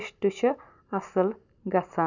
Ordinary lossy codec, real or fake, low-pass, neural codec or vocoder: none; real; 7.2 kHz; none